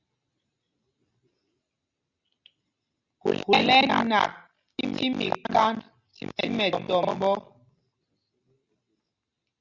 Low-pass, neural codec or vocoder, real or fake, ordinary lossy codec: 7.2 kHz; none; real; Opus, 64 kbps